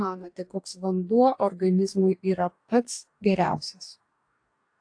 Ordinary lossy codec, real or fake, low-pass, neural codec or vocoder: AAC, 48 kbps; fake; 9.9 kHz; codec, 44.1 kHz, 2.6 kbps, DAC